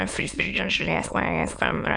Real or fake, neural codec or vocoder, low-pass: fake; autoencoder, 22.05 kHz, a latent of 192 numbers a frame, VITS, trained on many speakers; 9.9 kHz